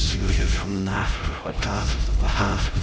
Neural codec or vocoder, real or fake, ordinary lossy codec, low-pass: codec, 16 kHz, 0.5 kbps, X-Codec, HuBERT features, trained on LibriSpeech; fake; none; none